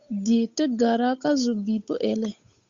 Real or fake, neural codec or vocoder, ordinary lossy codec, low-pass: fake; codec, 16 kHz, 8 kbps, FunCodec, trained on Chinese and English, 25 frames a second; Opus, 64 kbps; 7.2 kHz